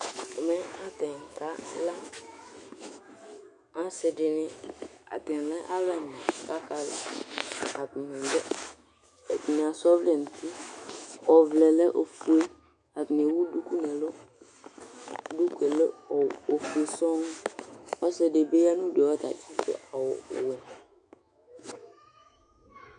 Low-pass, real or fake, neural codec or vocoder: 10.8 kHz; fake; autoencoder, 48 kHz, 128 numbers a frame, DAC-VAE, trained on Japanese speech